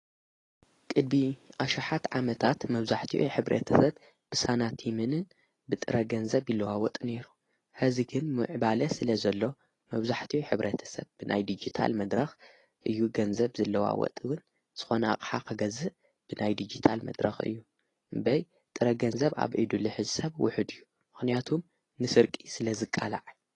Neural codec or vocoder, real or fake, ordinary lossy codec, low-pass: none; real; AAC, 32 kbps; 10.8 kHz